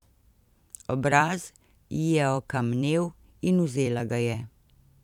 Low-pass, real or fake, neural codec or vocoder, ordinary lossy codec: 19.8 kHz; fake; vocoder, 44.1 kHz, 128 mel bands every 256 samples, BigVGAN v2; none